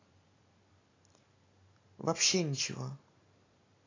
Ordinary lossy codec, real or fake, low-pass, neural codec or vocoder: AAC, 32 kbps; real; 7.2 kHz; none